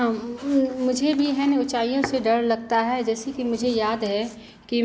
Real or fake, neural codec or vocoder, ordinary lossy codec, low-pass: real; none; none; none